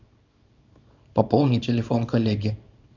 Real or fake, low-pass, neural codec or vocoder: fake; 7.2 kHz; codec, 24 kHz, 0.9 kbps, WavTokenizer, small release